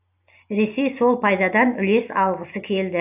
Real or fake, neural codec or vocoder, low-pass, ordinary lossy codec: real; none; 3.6 kHz; AAC, 32 kbps